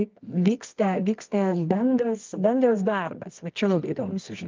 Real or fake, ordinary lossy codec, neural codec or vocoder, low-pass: fake; Opus, 24 kbps; codec, 24 kHz, 0.9 kbps, WavTokenizer, medium music audio release; 7.2 kHz